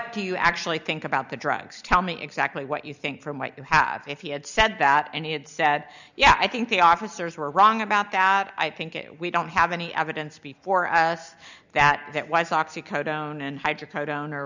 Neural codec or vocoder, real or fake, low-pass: none; real; 7.2 kHz